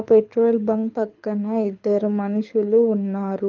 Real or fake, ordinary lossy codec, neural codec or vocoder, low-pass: fake; Opus, 24 kbps; codec, 24 kHz, 6 kbps, HILCodec; 7.2 kHz